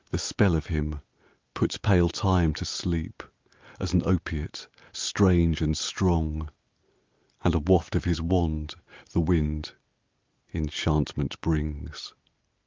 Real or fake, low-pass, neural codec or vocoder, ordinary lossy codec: real; 7.2 kHz; none; Opus, 32 kbps